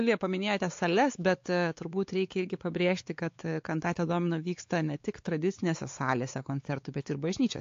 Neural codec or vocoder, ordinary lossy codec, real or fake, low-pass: codec, 16 kHz, 4 kbps, X-Codec, WavLM features, trained on Multilingual LibriSpeech; AAC, 48 kbps; fake; 7.2 kHz